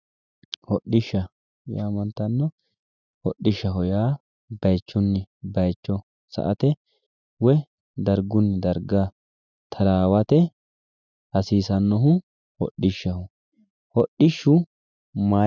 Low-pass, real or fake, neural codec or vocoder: 7.2 kHz; real; none